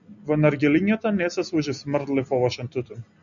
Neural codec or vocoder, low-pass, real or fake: none; 7.2 kHz; real